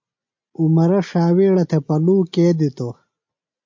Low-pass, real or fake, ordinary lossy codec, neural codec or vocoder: 7.2 kHz; real; MP3, 48 kbps; none